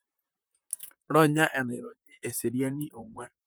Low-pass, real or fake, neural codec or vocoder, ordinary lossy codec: none; fake; vocoder, 44.1 kHz, 128 mel bands, Pupu-Vocoder; none